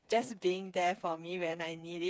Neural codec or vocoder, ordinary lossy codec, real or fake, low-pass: codec, 16 kHz, 4 kbps, FreqCodec, smaller model; none; fake; none